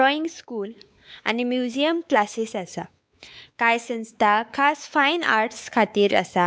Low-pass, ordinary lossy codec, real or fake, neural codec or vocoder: none; none; fake; codec, 16 kHz, 4 kbps, X-Codec, WavLM features, trained on Multilingual LibriSpeech